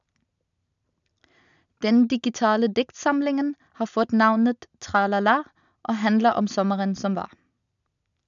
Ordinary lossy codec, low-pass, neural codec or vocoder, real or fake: none; 7.2 kHz; none; real